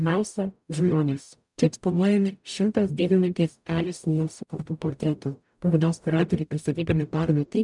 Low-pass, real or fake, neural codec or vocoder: 10.8 kHz; fake; codec, 44.1 kHz, 0.9 kbps, DAC